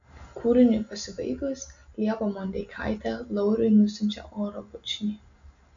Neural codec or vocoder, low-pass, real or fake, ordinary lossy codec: none; 7.2 kHz; real; MP3, 64 kbps